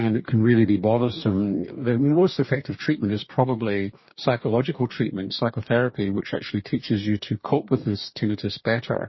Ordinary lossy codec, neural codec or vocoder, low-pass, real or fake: MP3, 24 kbps; codec, 44.1 kHz, 2.6 kbps, DAC; 7.2 kHz; fake